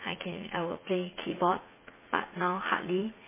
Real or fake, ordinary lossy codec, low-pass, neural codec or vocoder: fake; MP3, 16 kbps; 3.6 kHz; vocoder, 44.1 kHz, 80 mel bands, Vocos